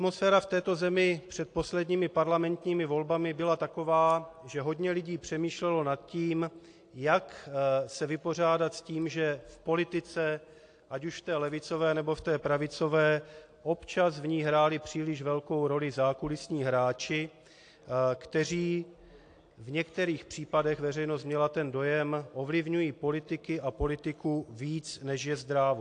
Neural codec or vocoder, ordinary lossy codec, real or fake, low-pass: none; AAC, 48 kbps; real; 9.9 kHz